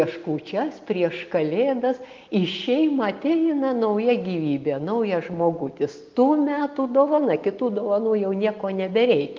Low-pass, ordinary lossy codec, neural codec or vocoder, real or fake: 7.2 kHz; Opus, 32 kbps; none; real